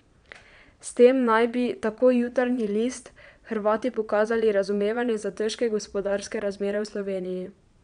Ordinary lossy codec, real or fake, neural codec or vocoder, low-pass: MP3, 96 kbps; fake; vocoder, 22.05 kHz, 80 mel bands, Vocos; 9.9 kHz